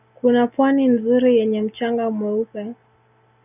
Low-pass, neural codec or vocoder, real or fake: 3.6 kHz; none; real